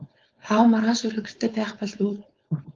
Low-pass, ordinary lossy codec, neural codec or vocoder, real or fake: 7.2 kHz; Opus, 24 kbps; codec, 16 kHz, 4.8 kbps, FACodec; fake